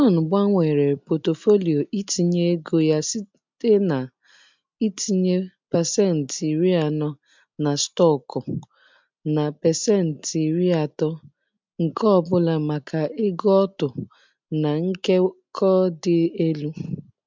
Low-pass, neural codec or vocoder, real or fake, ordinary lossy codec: 7.2 kHz; none; real; MP3, 64 kbps